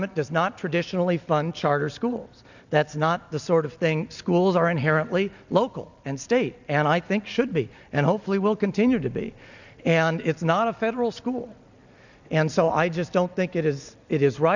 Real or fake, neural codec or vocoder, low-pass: real; none; 7.2 kHz